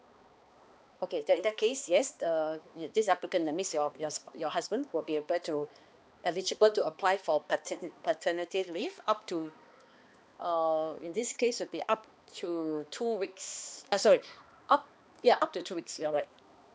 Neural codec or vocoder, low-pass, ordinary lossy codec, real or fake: codec, 16 kHz, 2 kbps, X-Codec, HuBERT features, trained on balanced general audio; none; none; fake